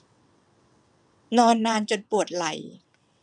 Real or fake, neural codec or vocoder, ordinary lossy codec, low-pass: fake; vocoder, 22.05 kHz, 80 mel bands, WaveNeXt; none; 9.9 kHz